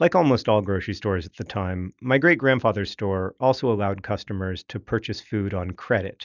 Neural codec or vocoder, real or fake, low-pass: none; real; 7.2 kHz